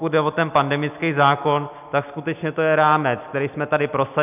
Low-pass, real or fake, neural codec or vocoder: 3.6 kHz; real; none